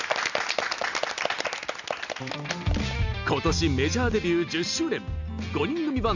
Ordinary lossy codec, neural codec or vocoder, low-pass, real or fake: none; none; 7.2 kHz; real